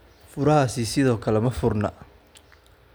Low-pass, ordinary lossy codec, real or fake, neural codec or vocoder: none; none; real; none